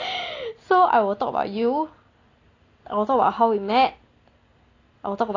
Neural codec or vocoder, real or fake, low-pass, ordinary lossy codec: none; real; 7.2 kHz; AAC, 32 kbps